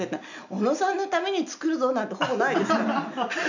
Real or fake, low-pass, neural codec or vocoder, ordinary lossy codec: real; 7.2 kHz; none; none